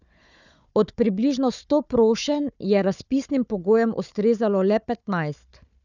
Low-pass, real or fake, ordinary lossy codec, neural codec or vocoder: 7.2 kHz; fake; none; codec, 16 kHz, 4 kbps, FunCodec, trained on Chinese and English, 50 frames a second